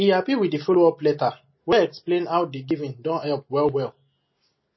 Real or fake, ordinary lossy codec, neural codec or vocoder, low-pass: real; MP3, 24 kbps; none; 7.2 kHz